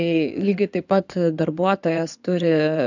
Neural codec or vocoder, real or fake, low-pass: codec, 16 kHz in and 24 kHz out, 2.2 kbps, FireRedTTS-2 codec; fake; 7.2 kHz